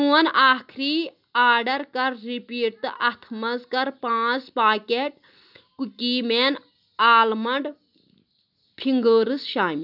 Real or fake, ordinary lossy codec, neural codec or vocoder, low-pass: real; none; none; 5.4 kHz